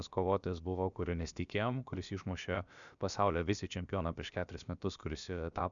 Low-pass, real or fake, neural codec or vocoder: 7.2 kHz; fake; codec, 16 kHz, about 1 kbps, DyCAST, with the encoder's durations